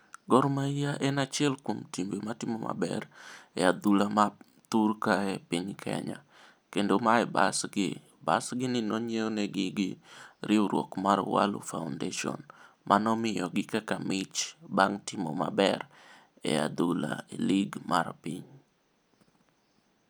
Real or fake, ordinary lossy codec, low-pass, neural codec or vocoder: real; none; none; none